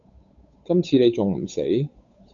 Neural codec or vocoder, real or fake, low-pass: codec, 16 kHz, 8 kbps, FunCodec, trained on Chinese and English, 25 frames a second; fake; 7.2 kHz